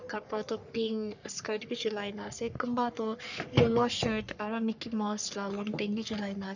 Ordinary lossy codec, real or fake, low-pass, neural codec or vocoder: none; fake; 7.2 kHz; codec, 44.1 kHz, 3.4 kbps, Pupu-Codec